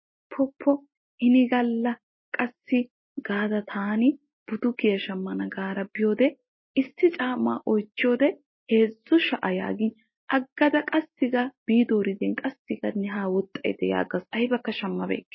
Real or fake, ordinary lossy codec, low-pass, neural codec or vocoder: real; MP3, 24 kbps; 7.2 kHz; none